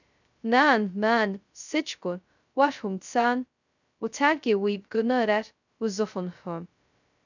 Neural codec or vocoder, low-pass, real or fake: codec, 16 kHz, 0.2 kbps, FocalCodec; 7.2 kHz; fake